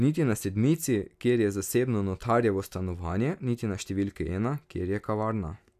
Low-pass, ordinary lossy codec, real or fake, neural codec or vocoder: 14.4 kHz; none; real; none